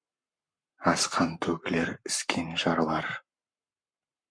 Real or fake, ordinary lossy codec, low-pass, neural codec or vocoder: fake; MP3, 64 kbps; 9.9 kHz; codec, 44.1 kHz, 7.8 kbps, Pupu-Codec